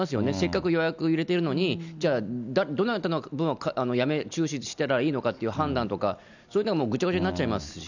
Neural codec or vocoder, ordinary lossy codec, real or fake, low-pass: none; none; real; 7.2 kHz